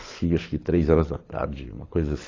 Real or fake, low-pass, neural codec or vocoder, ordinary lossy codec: fake; 7.2 kHz; codec, 24 kHz, 6 kbps, HILCodec; AAC, 32 kbps